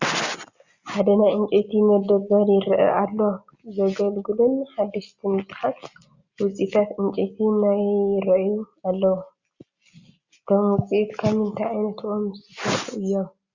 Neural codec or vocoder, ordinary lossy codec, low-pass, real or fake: none; Opus, 64 kbps; 7.2 kHz; real